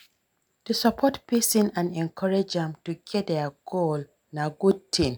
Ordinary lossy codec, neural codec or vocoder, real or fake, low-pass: none; none; real; none